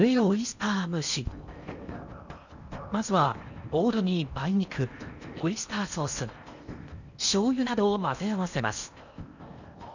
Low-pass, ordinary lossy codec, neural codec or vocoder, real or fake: 7.2 kHz; none; codec, 16 kHz in and 24 kHz out, 0.8 kbps, FocalCodec, streaming, 65536 codes; fake